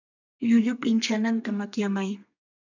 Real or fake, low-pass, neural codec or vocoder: fake; 7.2 kHz; codec, 32 kHz, 1.9 kbps, SNAC